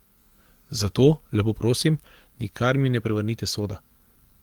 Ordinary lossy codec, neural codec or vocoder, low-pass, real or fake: Opus, 24 kbps; codec, 44.1 kHz, 7.8 kbps, DAC; 19.8 kHz; fake